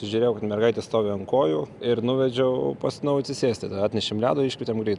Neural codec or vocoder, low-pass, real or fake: none; 10.8 kHz; real